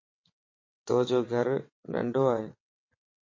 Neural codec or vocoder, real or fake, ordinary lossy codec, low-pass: vocoder, 22.05 kHz, 80 mel bands, WaveNeXt; fake; MP3, 32 kbps; 7.2 kHz